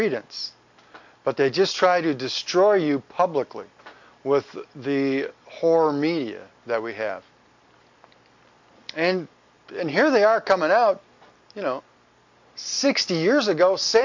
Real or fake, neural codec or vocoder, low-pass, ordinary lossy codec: real; none; 7.2 kHz; MP3, 48 kbps